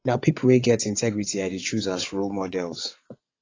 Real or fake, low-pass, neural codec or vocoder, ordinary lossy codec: fake; 7.2 kHz; vocoder, 22.05 kHz, 80 mel bands, WaveNeXt; AAC, 32 kbps